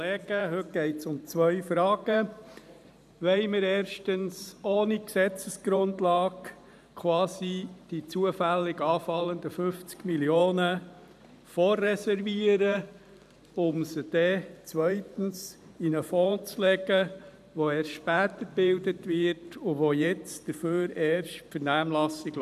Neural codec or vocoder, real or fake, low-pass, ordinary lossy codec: vocoder, 44.1 kHz, 128 mel bands every 512 samples, BigVGAN v2; fake; 14.4 kHz; none